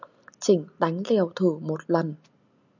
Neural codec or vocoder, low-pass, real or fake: none; 7.2 kHz; real